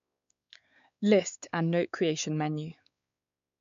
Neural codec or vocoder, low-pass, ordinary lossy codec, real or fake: codec, 16 kHz, 4 kbps, X-Codec, WavLM features, trained on Multilingual LibriSpeech; 7.2 kHz; none; fake